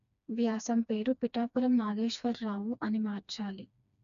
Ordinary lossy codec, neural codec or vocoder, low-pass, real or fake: none; codec, 16 kHz, 2 kbps, FreqCodec, smaller model; 7.2 kHz; fake